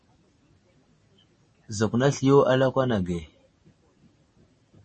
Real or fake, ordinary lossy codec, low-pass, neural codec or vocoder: real; MP3, 32 kbps; 10.8 kHz; none